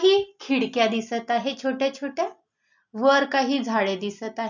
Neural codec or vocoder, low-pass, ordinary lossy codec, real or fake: none; 7.2 kHz; none; real